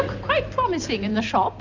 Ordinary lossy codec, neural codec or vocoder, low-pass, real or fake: Opus, 64 kbps; none; 7.2 kHz; real